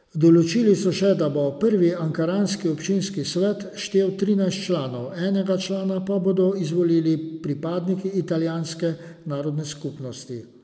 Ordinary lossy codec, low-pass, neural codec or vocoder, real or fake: none; none; none; real